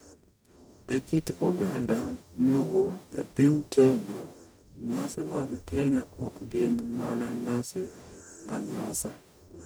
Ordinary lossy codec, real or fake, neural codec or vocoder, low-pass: none; fake; codec, 44.1 kHz, 0.9 kbps, DAC; none